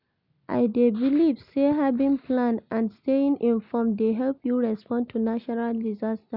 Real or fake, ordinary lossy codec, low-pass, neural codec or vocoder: real; none; 5.4 kHz; none